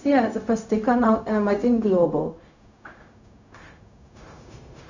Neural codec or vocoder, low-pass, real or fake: codec, 16 kHz, 0.4 kbps, LongCat-Audio-Codec; 7.2 kHz; fake